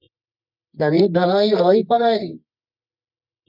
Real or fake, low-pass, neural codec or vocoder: fake; 5.4 kHz; codec, 24 kHz, 0.9 kbps, WavTokenizer, medium music audio release